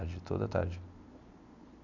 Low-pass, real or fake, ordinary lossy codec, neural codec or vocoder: 7.2 kHz; real; none; none